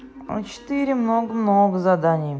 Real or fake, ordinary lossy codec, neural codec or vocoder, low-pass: real; none; none; none